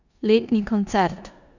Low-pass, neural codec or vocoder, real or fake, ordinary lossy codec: 7.2 kHz; codec, 16 kHz in and 24 kHz out, 0.9 kbps, LongCat-Audio-Codec, four codebook decoder; fake; none